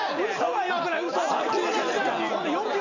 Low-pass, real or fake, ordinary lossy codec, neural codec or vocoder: 7.2 kHz; real; none; none